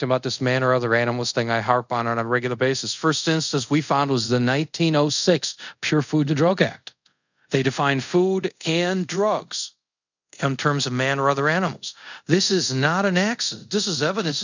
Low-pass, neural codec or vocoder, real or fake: 7.2 kHz; codec, 24 kHz, 0.5 kbps, DualCodec; fake